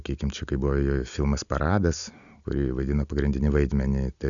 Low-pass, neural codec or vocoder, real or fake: 7.2 kHz; none; real